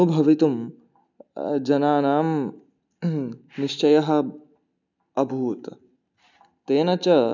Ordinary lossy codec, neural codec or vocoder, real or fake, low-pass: none; none; real; 7.2 kHz